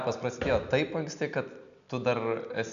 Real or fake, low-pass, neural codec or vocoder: real; 7.2 kHz; none